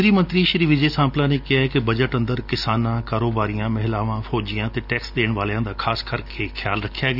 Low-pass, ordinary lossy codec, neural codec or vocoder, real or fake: 5.4 kHz; none; none; real